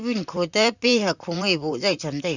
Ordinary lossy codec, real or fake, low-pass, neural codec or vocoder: none; fake; 7.2 kHz; vocoder, 44.1 kHz, 128 mel bands, Pupu-Vocoder